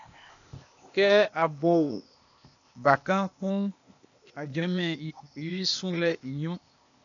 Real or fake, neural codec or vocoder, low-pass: fake; codec, 16 kHz, 0.8 kbps, ZipCodec; 7.2 kHz